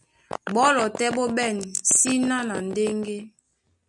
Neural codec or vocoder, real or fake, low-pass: none; real; 10.8 kHz